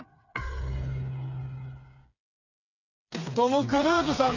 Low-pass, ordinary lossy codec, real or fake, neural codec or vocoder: 7.2 kHz; none; fake; codec, 16 kHz, 4 kbps, FreqCodec, smaller model